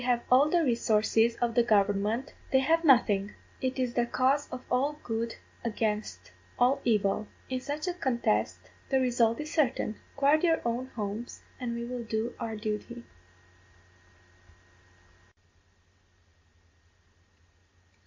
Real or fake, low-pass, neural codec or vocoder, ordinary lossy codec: real; 7.2 kHz; none; MP3, 64 kbps